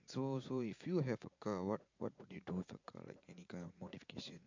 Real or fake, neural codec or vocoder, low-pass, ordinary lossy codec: fake; vocoder, 44.1 kHz, 80 mel bands, Vocos; 7.2 kHz; MP3, 48 kbps